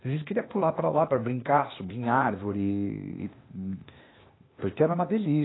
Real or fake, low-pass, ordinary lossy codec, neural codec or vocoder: fake; 7.2 kHz; AAC, 16 kbps; codec, 16 kHz, 0.8 kbps, ZipCodec